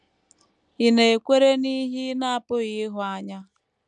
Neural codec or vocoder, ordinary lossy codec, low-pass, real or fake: none; none; 10.8 kHz; real